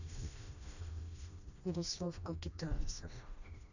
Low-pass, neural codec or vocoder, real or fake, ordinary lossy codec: 7.2 kHz; codec, 16 kHz, 1 kbps, FreqCodec, smaller model; fake; none